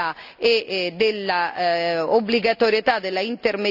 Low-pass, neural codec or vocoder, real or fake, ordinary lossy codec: 5.4 kHz; none; real; none